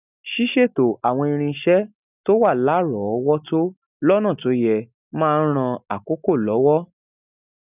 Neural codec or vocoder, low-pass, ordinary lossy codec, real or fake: none; 3.6 kHz; none; real